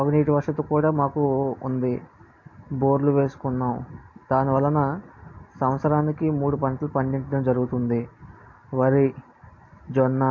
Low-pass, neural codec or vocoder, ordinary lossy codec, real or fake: 7.2 kHz; none; MP3, 48 kbps; real